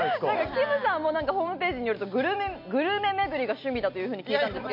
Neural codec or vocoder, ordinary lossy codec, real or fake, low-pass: none; MP3, 48 kbps; real; 5.4 kHz